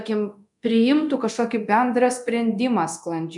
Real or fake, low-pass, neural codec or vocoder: fake; 10.8 kHz; codec, 24 kHz, 0.9 kbps, DualCodec